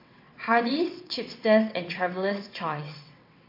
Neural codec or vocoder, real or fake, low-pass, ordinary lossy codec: vocoder, 22.05 kHz, 80 mel bands, WaveNeXt; fake; 5.4 kHz; MP3, 32 kbps